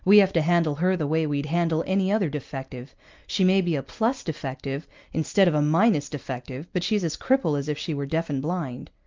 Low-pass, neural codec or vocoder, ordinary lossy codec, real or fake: 7.2 kHz; none; Opus, 24 kbps; real